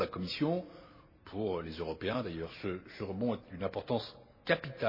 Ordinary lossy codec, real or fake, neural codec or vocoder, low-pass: MP3, 24 kbps; real; none; 5.4 kHz